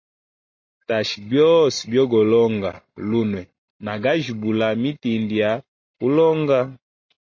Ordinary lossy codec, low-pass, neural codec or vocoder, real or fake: MP3, 32 kbps; 7.2 kHz; none; real